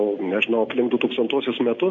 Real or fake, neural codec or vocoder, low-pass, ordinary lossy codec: real; none; 7.2 kHz; MP3, 48 kbps